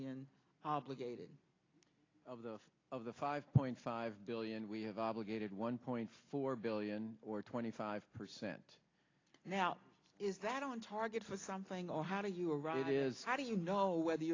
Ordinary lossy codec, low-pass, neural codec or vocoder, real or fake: AAC, 32 kbps; 7.2 kHz; none; real